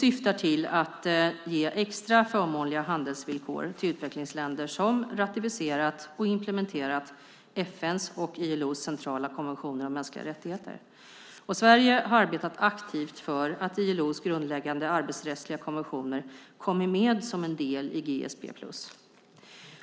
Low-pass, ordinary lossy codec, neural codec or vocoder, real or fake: none; none; none; real